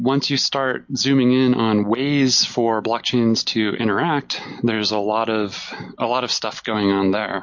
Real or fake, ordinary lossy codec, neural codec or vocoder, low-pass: real; MP3, 48 kbps; none; 7.2 kHz